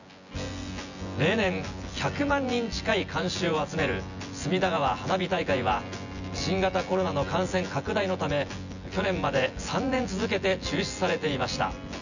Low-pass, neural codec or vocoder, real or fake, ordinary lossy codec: 7.2 kHz; vocoder, 24 kHz, 100 mel bands, Vocos; fake; none